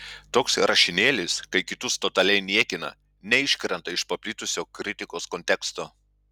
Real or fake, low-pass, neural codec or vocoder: real; 19.8 kHz; none